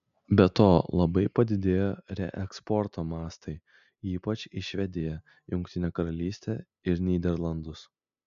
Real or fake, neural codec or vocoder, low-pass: real; none; 7.2 kHz